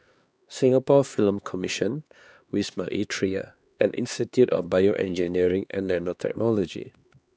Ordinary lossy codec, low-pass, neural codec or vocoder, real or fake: none; none; codec, 16 kHz, 2 kbps, X-Codec, HuBERT features, trained on LibriSpeech; fake